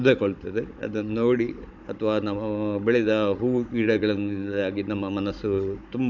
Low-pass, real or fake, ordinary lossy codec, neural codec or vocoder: 7.2 kHz; fake; none; codec, 16 kHz, 16 kbps, FunCodec, trained on Chinese and English, 50 frames a second